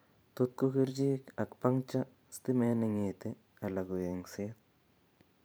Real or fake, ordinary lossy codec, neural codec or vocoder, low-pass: fake; none; vocoder, 44.1 kHz, 128 mel bands every 512 samples, BigVGAN v2; none